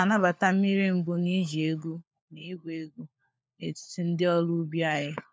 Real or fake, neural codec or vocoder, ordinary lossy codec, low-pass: fake; codec, 16 kHz, 4 kbps, FunCodec, trained on LibriTTS, 50 frames a second; none; none